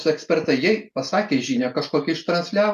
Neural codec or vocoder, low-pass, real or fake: none; 14.4 kHz; real